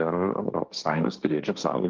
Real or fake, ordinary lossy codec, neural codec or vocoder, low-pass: fake; Opus, 24 kbps; codec, 16 kHz, 1.1 kbps, Voila-Tokenizer; 7.2 kHz